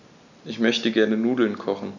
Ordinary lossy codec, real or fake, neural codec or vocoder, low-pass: none; real; none; 7.2 kHz